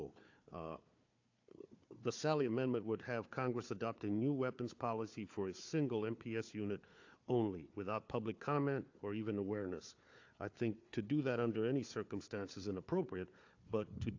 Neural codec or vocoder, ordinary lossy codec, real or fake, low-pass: codec, 16 kHz, 4 kbps, FunCodec, trained on Chinese and English, 50 frames a second; AAC, 48 kbps; fake; 7.2 kHz